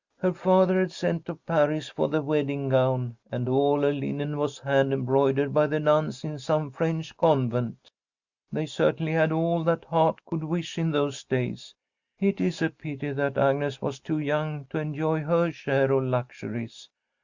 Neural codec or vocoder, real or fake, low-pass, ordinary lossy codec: none; real; 7.2 kHz; Opus, 64 kbps